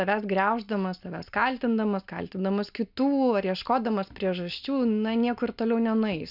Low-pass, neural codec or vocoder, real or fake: 5.4 kHz; none; real